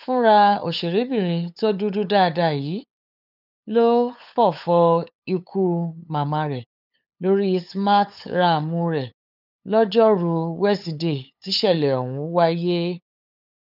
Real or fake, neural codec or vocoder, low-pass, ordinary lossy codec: fake; codec, 16 kHz, 8 kbps, FunCodec, trained on LibriTTS, 25 frames a second; 5.4 kHz; none